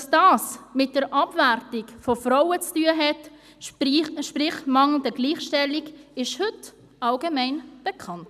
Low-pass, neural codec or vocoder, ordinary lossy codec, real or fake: 14.4 kHz; none; none; real